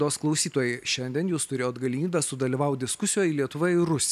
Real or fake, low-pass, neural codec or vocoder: real; 14.4 kHz; none